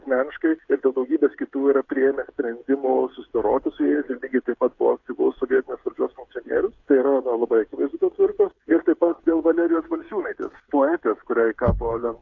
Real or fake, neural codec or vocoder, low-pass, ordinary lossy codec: fake; codec, 16 kHz, 8 kbps, FreqCodec, smaller model; 7.2 kHz; AAC, 48 kbps